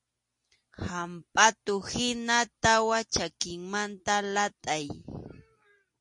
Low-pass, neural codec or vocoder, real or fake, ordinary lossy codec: 10.8 kHz; none; real; MP3, 48 kbps